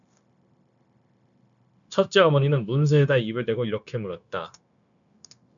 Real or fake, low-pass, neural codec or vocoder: fake; 7.2 kHz; codec, 16 kHz, 0.9 kbps, LongCat-Audio-Codec